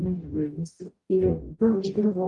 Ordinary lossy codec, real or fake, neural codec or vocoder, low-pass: Opus, 32 kbps; fake; codec, 44.1 kHz, 0.9 kbps, DAC; 10.8 kHz